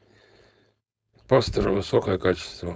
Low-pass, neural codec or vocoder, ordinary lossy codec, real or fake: none; codec, 16 kHz, 4.8 kbps, FACodec; none; fake